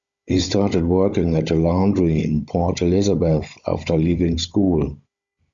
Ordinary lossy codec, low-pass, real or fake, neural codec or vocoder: Opus, 64 kbps; 7.2 kHz; fake; codec, 16 kHz, 16 kbps, FunCodec, trained on Chinese and English, 50 frames a second